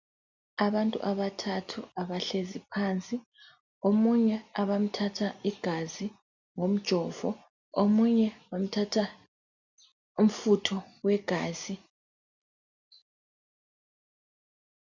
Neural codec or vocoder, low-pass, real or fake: none; 7.2 kHz; real